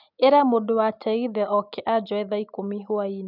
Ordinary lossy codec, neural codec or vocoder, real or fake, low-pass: none; none; real; 5.4 kHz